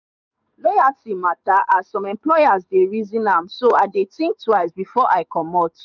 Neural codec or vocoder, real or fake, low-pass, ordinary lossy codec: codec, 44.1 kHz, 7.8 kbps, DAC; fake; 7.2 kHz; none